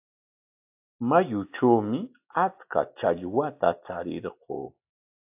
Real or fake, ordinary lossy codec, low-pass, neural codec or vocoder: real; AAC, 32 kbps; 3.6 kHz; none